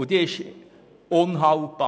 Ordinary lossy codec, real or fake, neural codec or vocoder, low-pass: none; real; none; none